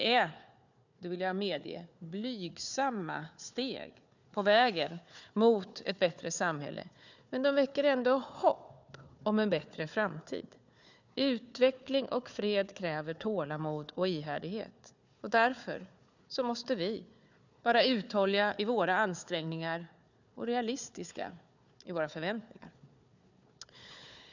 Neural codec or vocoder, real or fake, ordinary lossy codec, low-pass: codec, 16 kHz, 4 kbps, FunCodec, trained on Chinese and English, 50 frames a second; fake; none; 7.2 kHz